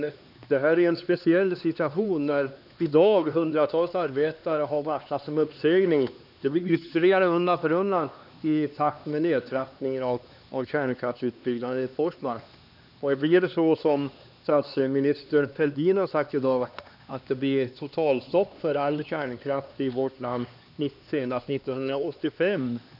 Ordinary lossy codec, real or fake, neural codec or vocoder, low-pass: none; fake; codec, 16 kHz, 2 kbps, X-Codec, HuBERT features, trained on LibriSpeech; 5.4 kHz